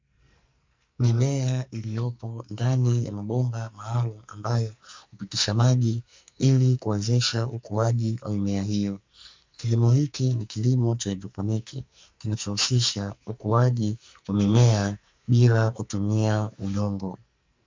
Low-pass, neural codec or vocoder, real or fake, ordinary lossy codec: 7.2 kHz; codec, 44.1 kHz, 2.6 kbps, SNAC; fake; MP3, 64 kbps